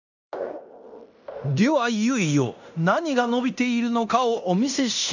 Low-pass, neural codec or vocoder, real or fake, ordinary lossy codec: 7.2 kHz; codec, 16 kHz in and 24 kHz out, 0.9 kbps, LongCat-Audio-Codec, fine tuned four codebook decoder; fake; none